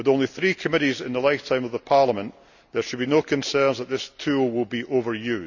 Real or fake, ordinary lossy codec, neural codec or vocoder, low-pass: real; none; none; 7.2 kHz